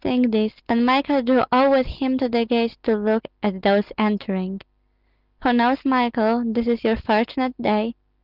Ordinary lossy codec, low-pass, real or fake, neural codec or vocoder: Opus, 32 kbps; 5.4 kHz; real; none